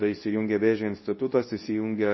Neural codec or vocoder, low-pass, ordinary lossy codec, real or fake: codec, 24 kHz, 0.9 kbps, WavTokenizer, medium speech release version 2; 7.2 kHz; MP3, 24 kbps; fake